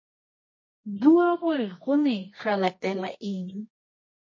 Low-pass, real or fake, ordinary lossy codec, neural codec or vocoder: 7.2 kHz; fake; MP3, 32 kbps; codec, 24 kHz, 0.9 kbps, WavTokenizer, medium music audio release